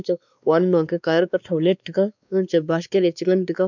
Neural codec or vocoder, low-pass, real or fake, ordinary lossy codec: codec, 16 kHz, 2 kbps, X-Codec, WavLM features, trained on Multilingual LibriSpeech; 7.2 kHz; fake; none